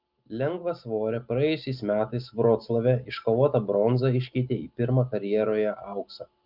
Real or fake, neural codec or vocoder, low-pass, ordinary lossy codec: real; none; 5.4 kHz; Opus, 32 kbps